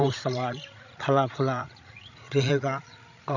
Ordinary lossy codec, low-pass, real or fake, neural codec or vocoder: none; 7.2 kHz; fake; codec, 16 kHz, 16 kbps, FreqCodec, larger model